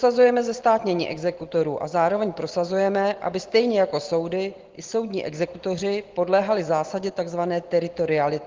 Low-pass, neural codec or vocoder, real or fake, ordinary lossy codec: 7.2 kHz; none; real; Opus, 16 kbps